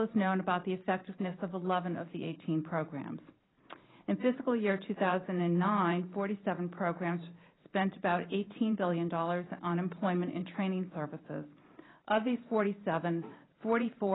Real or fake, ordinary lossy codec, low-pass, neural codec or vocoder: fake; AAC, 16 kbps; 7.2 kHz; vocoder, 44.1 kHz, 128 mel bands every 512 samples, BigVGAN v2